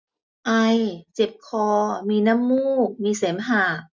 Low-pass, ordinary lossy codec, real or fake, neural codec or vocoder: none; none; real; none